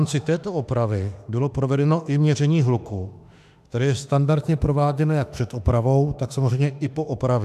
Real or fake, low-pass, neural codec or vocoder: fake; 14.4 kHz; autoencoder, 48 kHz, 32 numbers a frame, DAC-VAE, trained on Japanese speech